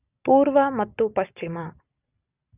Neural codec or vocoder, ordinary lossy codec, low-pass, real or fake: none; none; 3.6 kHz; real